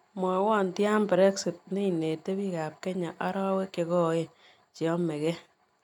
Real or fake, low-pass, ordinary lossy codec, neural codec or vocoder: real; 19.8 kHz; none; none